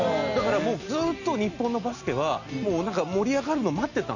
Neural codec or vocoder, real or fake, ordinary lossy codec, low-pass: none; real; none; 7.2 kHz